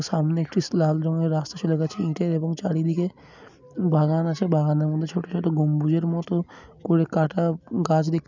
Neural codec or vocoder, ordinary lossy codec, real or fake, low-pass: none; none; real; 7.2 kHz